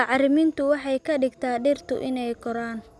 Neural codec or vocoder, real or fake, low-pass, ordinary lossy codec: none; real; none; none